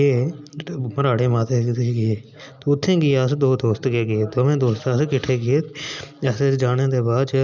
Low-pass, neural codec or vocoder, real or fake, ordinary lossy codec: 7.2 kHz; none; real; none